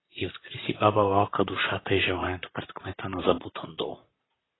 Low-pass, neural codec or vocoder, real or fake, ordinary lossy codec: 7.2 kHz; vocoder, 44.1 kHz, 128 mel bands, Pupu-Vocoder; fake; AAC, 16 kbps